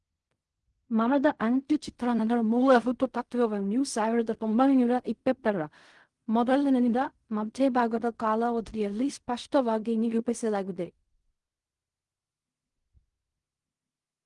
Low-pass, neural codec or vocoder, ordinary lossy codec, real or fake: 10.8 kHz; codec, 16 kHz in and 24 kHz out, 0.4 kbps, LongCat-Audio-Codec, fine tuned four codebook decoder; Opus, 24 kbps; fake